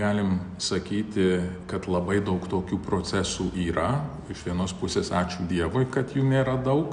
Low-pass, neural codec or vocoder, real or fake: 9.9 kHz; none; real